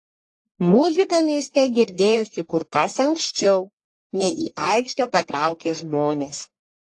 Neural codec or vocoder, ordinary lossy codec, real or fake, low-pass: codec, 44.1 kHz, 1.7 kbps, Pupu-Codec; AAC, 48 kbps; fake; 10.8 kHz